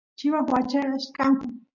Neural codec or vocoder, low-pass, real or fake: none; 7.2 kHz; real